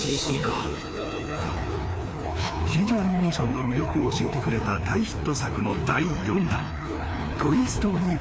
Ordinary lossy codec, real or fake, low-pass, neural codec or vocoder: none; fake; none; codec, 16 kHz, 2 kbps, FreqCodec, larger model